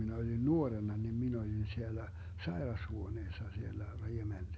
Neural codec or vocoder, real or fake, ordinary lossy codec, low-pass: none; real; none; none